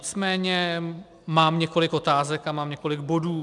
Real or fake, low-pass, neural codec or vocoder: real; 10.8 kHz; none